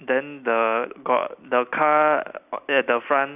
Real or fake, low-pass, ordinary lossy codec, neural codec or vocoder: real; 3.6 kHz; none; none